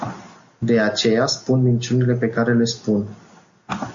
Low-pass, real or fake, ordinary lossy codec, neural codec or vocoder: 7.2 kHz; real; Opus, 64 kbps; none